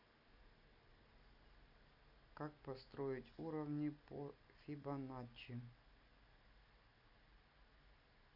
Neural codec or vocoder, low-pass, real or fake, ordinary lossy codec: none; 5.4 kHz; real; none